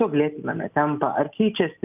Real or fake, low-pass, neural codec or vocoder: real; 3.6 kHz; none